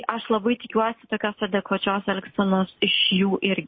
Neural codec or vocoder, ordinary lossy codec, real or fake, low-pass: none; MP3, 24 kbps; real; 7.2 kHz